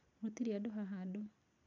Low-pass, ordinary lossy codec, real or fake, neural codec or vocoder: 7.2 kHz; none; real; none